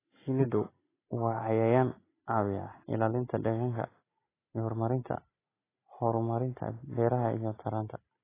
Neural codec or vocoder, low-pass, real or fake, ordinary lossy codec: none; 3.6 kHz; real; AAC, 16 kbps